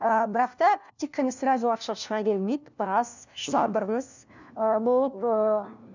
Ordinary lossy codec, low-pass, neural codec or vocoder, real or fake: none; 7.2 kHz; codec, 16 kHz, 1 kbps, FunCodec, trained on LibriTTS, 50 frames a second; fake